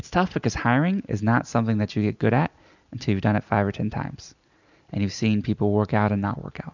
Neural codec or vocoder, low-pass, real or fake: none; 7.2 kHz; real